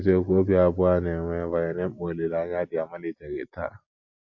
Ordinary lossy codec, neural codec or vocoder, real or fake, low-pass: AAC, 48 kbps; none; real; 7.2 kHz